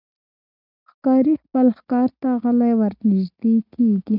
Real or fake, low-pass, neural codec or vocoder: real; 5.4 kHz; none